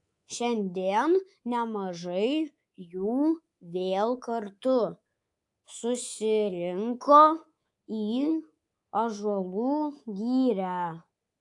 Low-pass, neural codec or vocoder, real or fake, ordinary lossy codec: 10.8 kHz; codec, 24 kHz, 3.1 kbps, DualCodec; fake; AAC, 64 kbps